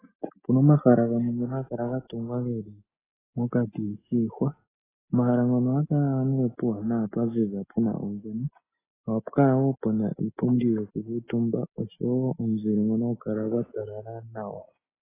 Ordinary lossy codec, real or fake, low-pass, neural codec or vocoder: AAC, 16 kbps; real; 3.6 kHz; none